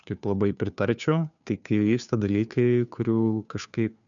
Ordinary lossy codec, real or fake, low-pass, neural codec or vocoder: MP3, 96 kbps; fake; 7.2 kHz; codec, 16 kHz, 2 kbps, FunCodec, trained on Chinese and English, 25 frames a second